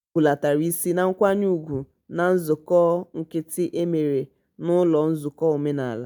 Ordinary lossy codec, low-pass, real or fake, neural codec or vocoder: none; 19.8 kHz; real; none